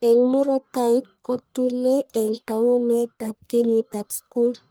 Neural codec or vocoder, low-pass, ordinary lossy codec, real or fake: codec, 44.1 kHz, 1.7 kbps, Pupu-Codec; none; none; fake